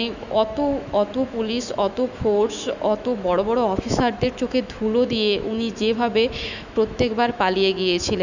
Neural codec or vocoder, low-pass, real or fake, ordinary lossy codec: autoencoder, 48 kHz, 128 numbers a frame, DAC-VAE, trained on Japanese speech; 7.2 kHz; fake; none